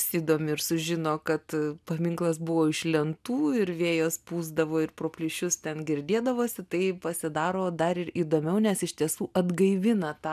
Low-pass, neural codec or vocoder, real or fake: 14.4 kHz; none; real